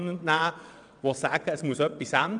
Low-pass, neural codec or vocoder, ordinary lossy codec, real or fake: 9.9 kHz; vocoder, 22.05 kHz, 80 mel bands, WaveNeXt; none; fake